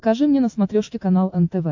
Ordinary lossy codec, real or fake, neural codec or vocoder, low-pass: AAC, 48 kbps; real; none; 7.2 kHz